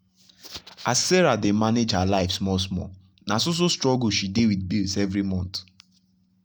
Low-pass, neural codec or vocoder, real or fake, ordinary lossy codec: none; none; real; none